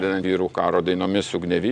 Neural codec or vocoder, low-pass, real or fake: none; 9.9 kHz; real